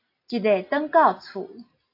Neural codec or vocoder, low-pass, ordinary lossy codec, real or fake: none; 5.4 kHz; AAC, 32 kbps; real